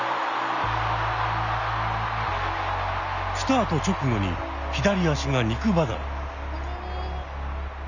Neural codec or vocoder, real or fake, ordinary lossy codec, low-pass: none; real; none; 7.2 kHz